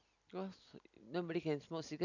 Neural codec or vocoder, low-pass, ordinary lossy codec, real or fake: none; 7.2 kHz; none; real